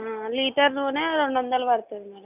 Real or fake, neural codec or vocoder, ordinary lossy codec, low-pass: real; none; none; 3.6 kHz